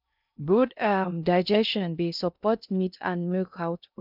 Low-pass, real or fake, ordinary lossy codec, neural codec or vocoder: 5.4 kHz; fake; none; codec, 16 kHz in and 24 kHz out, 0.6 kbps, FocalCodec, streaming, 2048 codes